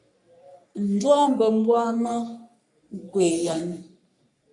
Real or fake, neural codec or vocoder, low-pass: fake; codec, 44.1 kHz, 3.4 kbps, Pupu-Codec; 10.8 kHz